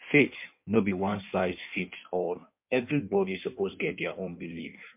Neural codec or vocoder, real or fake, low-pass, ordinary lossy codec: codec, 16 kHz in and 24 kHz out, 1.1 kbps, FireRedTTS-2 codec; fake; 3.6 kHz; MP3, 32 kbps